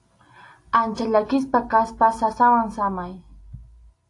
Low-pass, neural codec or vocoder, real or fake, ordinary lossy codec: 10.8 kHz; none; real; AAC, 48 kbps